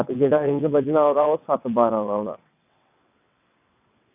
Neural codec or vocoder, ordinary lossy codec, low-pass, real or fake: vocoder, 22.05 kHz, 80 mel bands, WaveNeXt; none; 3.6 kHz; fake